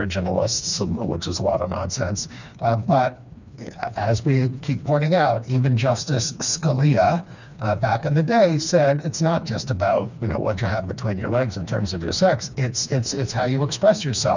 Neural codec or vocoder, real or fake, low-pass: codec, 16 kHz, 2 kbps, FreqCodec, smaller model; fake; 7.2 kHz